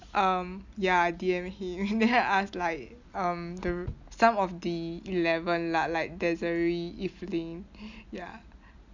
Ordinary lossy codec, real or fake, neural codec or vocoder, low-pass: none; real; none; 7.2 kHz